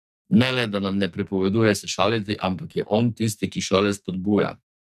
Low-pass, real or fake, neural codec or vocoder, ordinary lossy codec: 14.4 kHz; fake; codec, 44.1 kHz, 2.6 kbps, SNAC; none